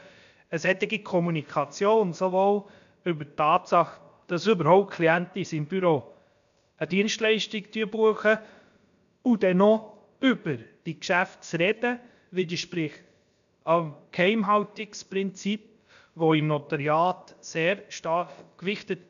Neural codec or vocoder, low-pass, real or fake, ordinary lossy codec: codec, 16 kHz, about 1 kbps, DyCAST, with the encoder's durations; 7.2 kHz; fake; none